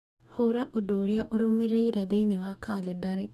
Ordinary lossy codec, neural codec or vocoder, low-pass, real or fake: none; codec, 44.1 kHz, 2.6 kbps, DAC; 14.4 kHz; fake